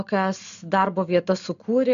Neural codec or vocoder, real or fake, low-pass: none; real; 7.2 kHz